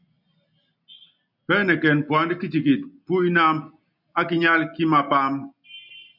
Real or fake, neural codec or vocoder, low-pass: real; none; 5.4 kHz